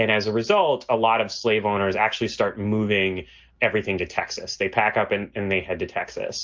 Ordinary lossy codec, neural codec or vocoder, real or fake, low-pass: Opus, 32 kbps; none; real; 7.2 kHz